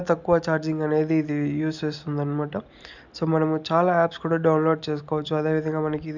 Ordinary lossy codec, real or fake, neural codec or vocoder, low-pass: none; real; none; 7.2 kHz